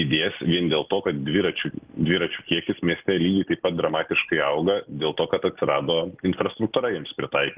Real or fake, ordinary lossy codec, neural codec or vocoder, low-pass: real; Opus, 32 kbps; none; 3.6 kHz